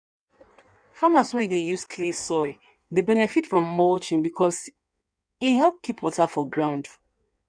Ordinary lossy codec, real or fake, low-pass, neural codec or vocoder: none; fake; 9.9 kHz; codec, 16 kHz in and 24 kHz out, 1.1 kbps, FireRedTTS-2 codec